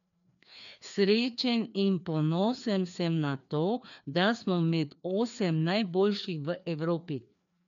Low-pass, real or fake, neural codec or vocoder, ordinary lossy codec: 7.2 kHz; fake; codec, 16 kHz, 2 kbps, FreqCodec, larger model; none